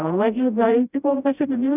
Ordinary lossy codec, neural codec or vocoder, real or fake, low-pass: none; codec, 16 kHz, 0.5 kbps, FreqCodec, smaller model; fake; 3.6 kHz